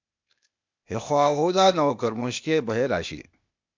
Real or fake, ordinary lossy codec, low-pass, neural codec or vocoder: fake; MP3, 64 kbps; 7.2 kHz; codec, 16 kHz, 0.8 kbps, ZipCodec